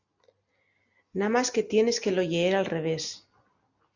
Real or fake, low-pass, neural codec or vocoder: real; 7.2 kHz; none